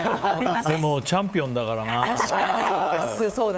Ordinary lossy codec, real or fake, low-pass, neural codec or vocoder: none; fake; none; codec, 16 kHz, 8 kbps, FunCodec, trained on LibriTTS, 25 frames a second